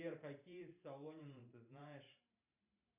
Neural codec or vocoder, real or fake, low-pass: vocoder, 44.1 kHz, 128 mel bands every 512 samples, BigVGAN v2; fake; 3.6 kHz